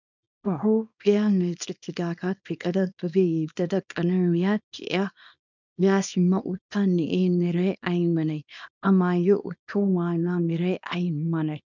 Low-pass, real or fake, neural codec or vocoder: 7.2 kHz; fake; codec, 24 kHz, 0.9 kbps, WavTokenizer, small release